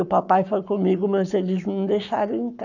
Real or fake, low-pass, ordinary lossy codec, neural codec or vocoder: fake; 7.2 kHz; none; codec, 44.1 kHz, 7.8 kbps, Pupu-Codec